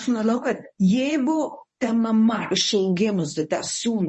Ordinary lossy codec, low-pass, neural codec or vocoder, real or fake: MP3, 32 kbps; 10.8 kHz; codec, 24 kHz, 0.9 kbps, WavTokenizer, medium speech release version 1; fake